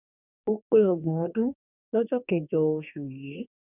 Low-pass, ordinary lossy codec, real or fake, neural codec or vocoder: 3.6 kHz; none; fake; codec, 44.1 kHz, 2.6 kbps, DAC